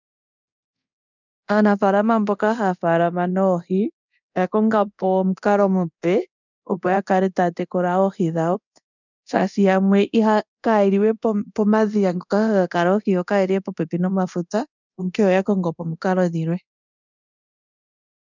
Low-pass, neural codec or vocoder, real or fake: 7.2 kHz; codec, 24 kHz, 0.9 kbps, DualCodec; fake